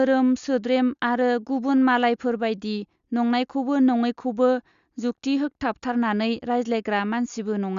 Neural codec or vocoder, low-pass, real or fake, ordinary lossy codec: none; 7.2 kHz; real; Opus, 64 kbps